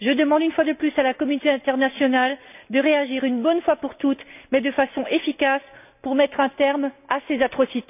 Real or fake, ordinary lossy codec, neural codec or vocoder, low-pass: real; none; none; 3.6 kHz